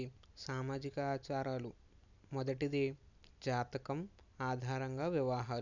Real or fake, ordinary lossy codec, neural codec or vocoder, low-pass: real; none; none; 7.2 kHz